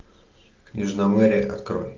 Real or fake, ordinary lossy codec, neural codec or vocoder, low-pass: real; Opus, 24 kbps; none; 7.2 kHz